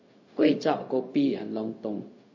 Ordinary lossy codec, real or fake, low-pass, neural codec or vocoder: MP3, 32 kbps; fake; 7.2 kHz; codec, 16 kHz, 0.4 kbps, LongCat-Audio-Codec